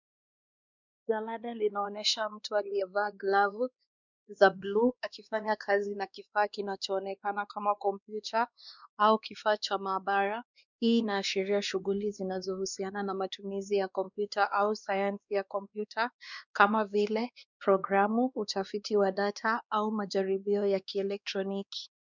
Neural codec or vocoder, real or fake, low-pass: codec, 16 kHz, 2 kbps, X-Codec, WavLM features, trained on Multilingual LibriSpeech; fake; 7.2 kHz